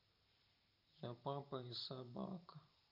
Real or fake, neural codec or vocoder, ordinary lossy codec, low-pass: fake; vocoder, 44.1 kHz, 128 mel bands every 512 samples, BigVGAN v2; none; 5.4 kHz